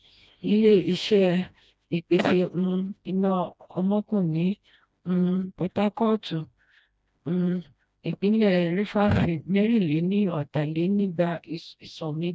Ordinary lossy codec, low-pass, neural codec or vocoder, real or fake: none; none; codec, 16 kHz, 1 kbps, FreqCodec, smaller model; fake